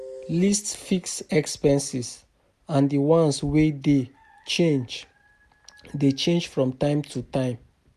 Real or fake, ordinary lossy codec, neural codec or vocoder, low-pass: real; none; none; 14.4 kHz